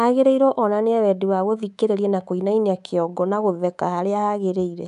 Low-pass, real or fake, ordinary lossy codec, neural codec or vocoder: 10.8 kHz; fake; none; codec, 24 kHz, 3.1 kbps, DualCodec